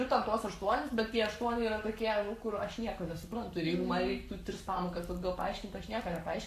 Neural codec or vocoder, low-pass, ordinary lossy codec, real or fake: codec, 44.1 kHz, 7.8 kbps, Pupu-Codec; 14.4 kHz; AAC, 96 kbps; fake